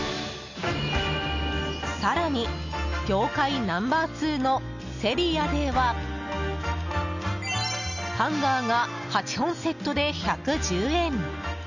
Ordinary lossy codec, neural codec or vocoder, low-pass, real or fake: none; none; 7.2 kHz; real